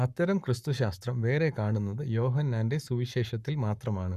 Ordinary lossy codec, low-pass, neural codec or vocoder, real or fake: none; 14.4 kHz; codec, 44.1 kHz, 7.8 kbps, Pupu-Codec; fake